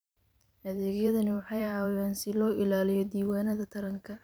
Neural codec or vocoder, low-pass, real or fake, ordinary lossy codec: vocoder, 44.1 kHz, 128 mel bands every 512 samples, BigVGAN v2; none; fake; none